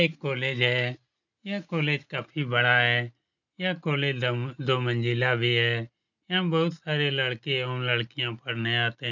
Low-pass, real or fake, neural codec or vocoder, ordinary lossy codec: 7.2 kHz; real; none; none